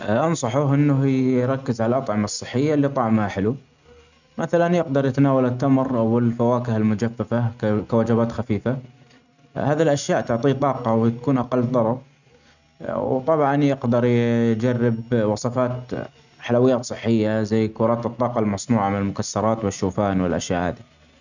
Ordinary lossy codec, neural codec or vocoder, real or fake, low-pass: none; none; real; 7.2 kHz